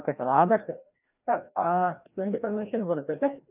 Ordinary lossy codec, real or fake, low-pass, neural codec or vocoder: none; fake; 3.6 kHz; codec, 16 kHz, 1 kbps, FreqCodec, larger model